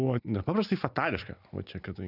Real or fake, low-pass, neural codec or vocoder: real; 5.4 kHz; none